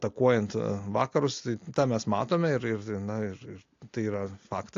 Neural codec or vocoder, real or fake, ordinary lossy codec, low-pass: none; real; AAC, 48 kbps; 7.2 kHz